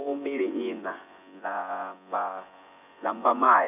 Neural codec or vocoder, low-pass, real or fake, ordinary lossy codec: vocoder, 24 kHz, 100 mel bands, Vocos; 3.6 kHz; fake; none